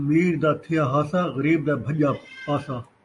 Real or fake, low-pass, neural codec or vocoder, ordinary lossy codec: real; 10.8 kHz; none; AAC, 64 kbps